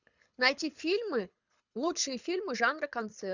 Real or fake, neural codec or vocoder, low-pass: fake; codec, 24 kHz, 6 kbps, HILCodec; 7.2 kHz